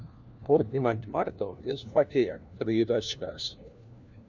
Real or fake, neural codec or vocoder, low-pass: fake; codec, 16 kHz, 1 kbps, FunCodec, trained on LibriTTS, 50 frames a second; 7.2 kHz